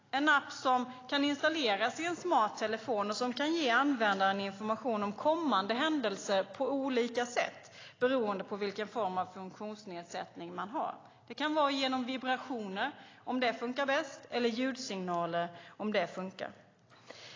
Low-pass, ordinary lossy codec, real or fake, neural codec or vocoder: 7.2 kHz; AAC, 32 kbps; real; none